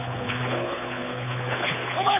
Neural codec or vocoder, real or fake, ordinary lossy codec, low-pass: codec, 24 kHz, 6 kbps, HILCodec; fake; none; 3.6 kHz